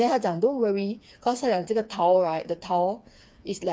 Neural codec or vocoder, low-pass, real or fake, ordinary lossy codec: codec, 16 kHz, 4 kbps, FreqCodec, smaller model; none; fake; none